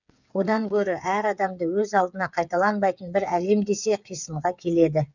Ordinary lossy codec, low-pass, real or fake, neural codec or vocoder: none; 7.2 kHz; fake; codec, 16 kHz, 8 kbps, FreqCodec, smaller model